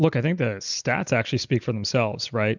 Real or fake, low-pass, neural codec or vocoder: real; 7.2 kHz; none